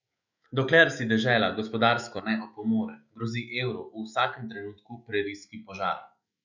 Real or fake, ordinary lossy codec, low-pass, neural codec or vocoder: fake; none; 7.2 kHz; autoencoder, 48 kHz, 128 numbers a frame, DAC-VAE, trained on Japanese speech